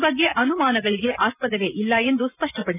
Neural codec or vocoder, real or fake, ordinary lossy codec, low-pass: none; real; none; 3.6 kHz